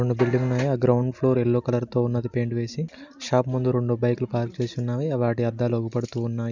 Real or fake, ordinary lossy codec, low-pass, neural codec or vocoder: real; none; 7.2 kHz; none